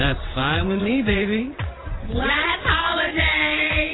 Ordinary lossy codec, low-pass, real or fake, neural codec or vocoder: AAC, 16 kbps; 7.2 kHz; fake; vocoder, 22.05 kHz, 80 mel bands, WaveNeXt